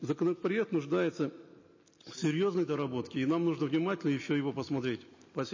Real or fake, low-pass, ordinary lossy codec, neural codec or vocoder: real; 7.2 kHz; MP3, 32 kbps; none